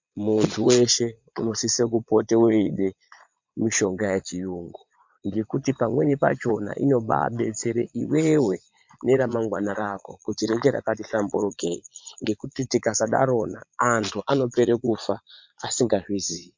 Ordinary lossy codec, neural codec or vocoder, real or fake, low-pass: MP3, 64 kbps; vocoder, 22.05 kHz, 80 mel bands, Vocos; fake; 7.2 kHz